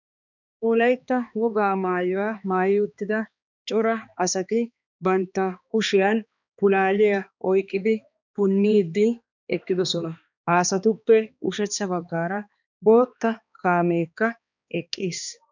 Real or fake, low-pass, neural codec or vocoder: fake; 7.2 kHz; codec, 16 kHz, 2 kbps, X-Codec, HuBERT features, trained on balanced general audio